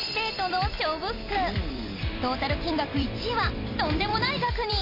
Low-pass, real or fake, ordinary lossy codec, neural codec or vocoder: 5.4 kHz; fake; AAC, 32 kbps; vocoder, 44.1 kHz, 128 mel bands every 256 samples, BigVGAN v2